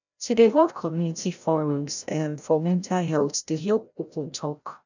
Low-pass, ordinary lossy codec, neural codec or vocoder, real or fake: 7.2 kHz; none; codec, 16 kHz, 0.5 kbps, FreqCodec, larger model; fake